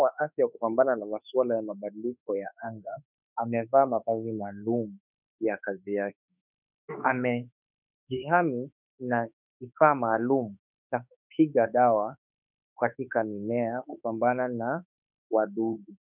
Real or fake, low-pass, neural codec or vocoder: fake; 3.6 kHz; autoencoder, 48 kHz, 32 numbers a frame, DAC-VAE, trained on Japanese speech